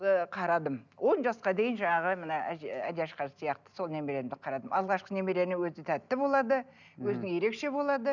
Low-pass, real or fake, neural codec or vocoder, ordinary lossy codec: 7.2 kHz; real; none; none